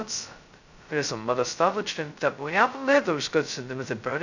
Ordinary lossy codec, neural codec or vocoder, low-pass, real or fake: none; codec, 16 kHz, 0.2 kbps, FocalCodec; 7.2 kHz; fake